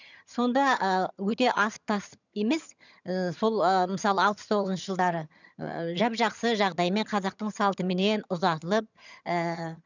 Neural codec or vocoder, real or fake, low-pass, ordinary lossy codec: vocoder, 22.05 kHz, 80 mel bands, HiFi-GAN; fake; 7.2 kHz; none